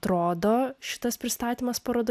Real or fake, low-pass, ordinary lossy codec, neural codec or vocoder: real; 14.4 kHz; AAC, 96 kbps; none